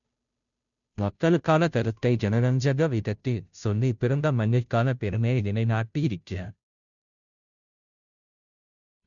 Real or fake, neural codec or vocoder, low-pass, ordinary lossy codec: fake; codec, 16 kHz, 0.5 kbps, FunCodec, trained on Chinese and English, 25 frames a second; 7.2 kHz; none